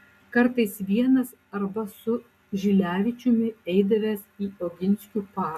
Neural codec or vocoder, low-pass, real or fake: none; 14.4 kHz; real